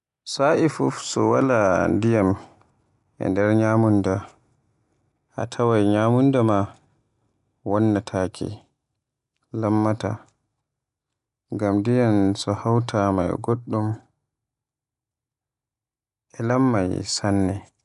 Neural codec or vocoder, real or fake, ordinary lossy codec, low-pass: none; real; none; 10.8 kHz